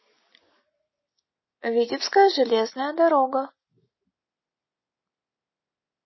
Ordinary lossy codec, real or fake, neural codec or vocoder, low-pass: MP3, 24 kbps; real; none; 7.2 kHz